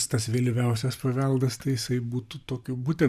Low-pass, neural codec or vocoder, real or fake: 14.4 kHz; vocoder, 44.1 kHz, 128 mel bands every 512 samples, BigVGAN v2; fake